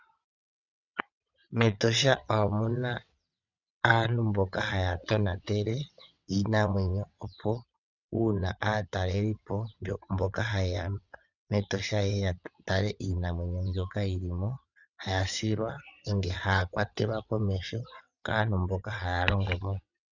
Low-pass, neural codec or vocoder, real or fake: 7.2 kHz; vocoder, 22.05 kHz, 80 mel bands, WaveNeXt; fake